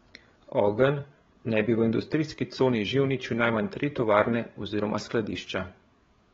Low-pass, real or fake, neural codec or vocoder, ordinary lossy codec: 7.2 kHz; fake; codec, 16 kHz, 8 kbps, FreqCodec, larger model; AAC, 24 kbps